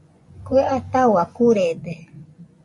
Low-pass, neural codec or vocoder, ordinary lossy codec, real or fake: 10.8 kHz; vocoder, 24 kHz, 100 mel bands, Vocos; MP3, 48 kbps; fake